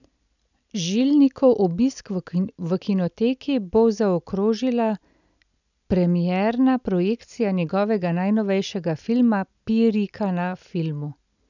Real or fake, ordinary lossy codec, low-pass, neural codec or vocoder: real; none; 7.2 kHz; none